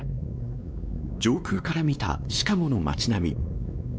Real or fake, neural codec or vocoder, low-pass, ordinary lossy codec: fake; codec, 16 kHz, 2 kbps, X-Codec, WavLM features, trained on Multilingual LibriSpeech; none; none